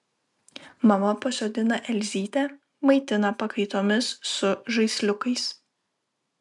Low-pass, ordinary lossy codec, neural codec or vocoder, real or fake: 10.8 kHz; MP3, 96 kbps; none; real